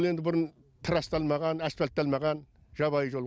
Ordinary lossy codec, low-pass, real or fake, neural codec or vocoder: none; none; real; none